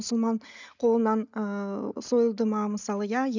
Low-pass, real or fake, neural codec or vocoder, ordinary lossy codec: 7.2 kHz; real; none; none